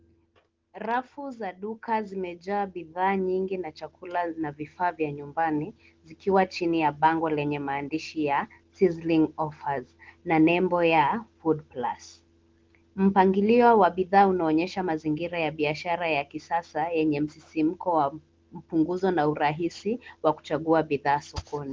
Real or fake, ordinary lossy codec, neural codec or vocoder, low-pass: real; Opus, 16 kbps; none; 7.2 kHz